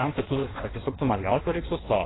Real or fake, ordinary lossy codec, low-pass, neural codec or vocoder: fake; AAC, 16 kbps; 7.2 kHz; codec, 16 kHz in and 24 kHz out, 1.1 kbps, FireRedTTS-2 codec